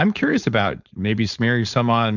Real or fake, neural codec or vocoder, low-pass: fake; codec, 16 kHz, 4.8 kbps, FACodec; 7.2 kHz